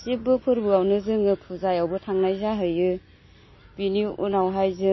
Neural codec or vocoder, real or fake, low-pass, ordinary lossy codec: none; real; 7.2 kHz; MP3, 24 kbps